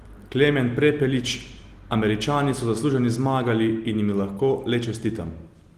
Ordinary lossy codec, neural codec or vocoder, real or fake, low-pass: Opus, 24 kbps; none; real; 14.4 kHz